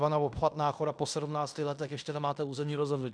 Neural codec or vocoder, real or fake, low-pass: codec, 16 kHz in and 24 kHz out, 0.9 kbps, LongCat-Audio-Codec, fine tuned four codebook decoder; fake; 9.9 kHz